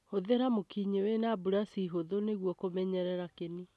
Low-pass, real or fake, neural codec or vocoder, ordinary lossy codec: none; real; none; none